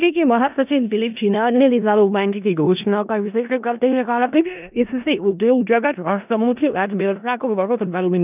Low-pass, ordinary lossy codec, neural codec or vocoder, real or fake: 3.6 kHz; none; codec, 16 kHz in and 24 kHz out, 0.4 kbps, LongCat-Audio-Codec, four codebook decoder; fake